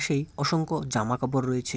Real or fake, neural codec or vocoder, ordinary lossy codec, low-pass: real; none; none; none